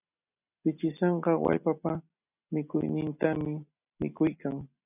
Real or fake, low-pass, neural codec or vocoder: real; 3.6 kHz; none